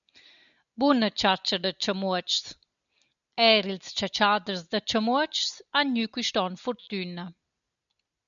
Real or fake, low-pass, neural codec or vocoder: real; 7.2 kHz; none